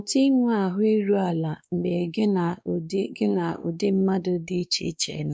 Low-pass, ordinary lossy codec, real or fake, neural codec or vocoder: none; none; fake; codec, 16 kHz, 2 kbps, X-Codec, WavLM features, trained on Multilingual LibriSpeech